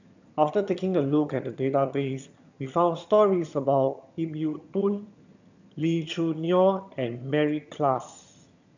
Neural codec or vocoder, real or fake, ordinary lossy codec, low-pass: vocoder, 22.05 kHz, 80 mel bands, HiFi-GAN; fake; none; 7.2 kHz